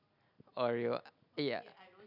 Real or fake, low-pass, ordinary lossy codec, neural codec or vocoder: real; 5.4 kHz; none; none